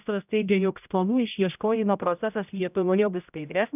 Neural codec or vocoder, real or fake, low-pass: codec, 16 kHz, 0.5 kbps, X-Codec, HuBERT features, trained on general audio; fake; 3.6 kHz